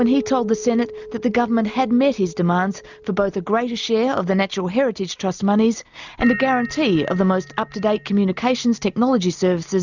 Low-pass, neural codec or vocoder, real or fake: 7.2 kHz; none; real